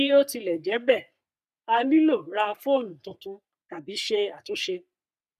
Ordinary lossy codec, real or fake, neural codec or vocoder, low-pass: MP3, 96 kbps; fake; codec, 44.1 kHz, 3.4 kbps, Pupu-Codec; 14.4 kHz